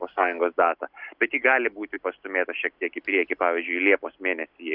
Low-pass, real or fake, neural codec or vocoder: 5.4 kHz; real; none